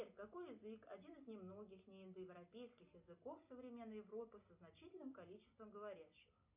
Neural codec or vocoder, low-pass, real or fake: none; 3.6 kHz; real